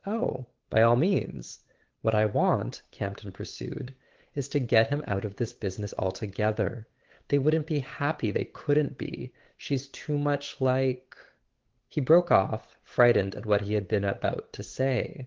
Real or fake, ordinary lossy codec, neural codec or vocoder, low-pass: fake; Opus, 16 kbps; codec, 16 kHz, 8 kbps, FunCodec, trained on Chinese and English, 25 frames a second; 7.2 kHz